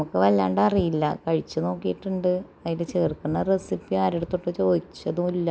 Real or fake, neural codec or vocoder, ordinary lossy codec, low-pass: real; none; none; none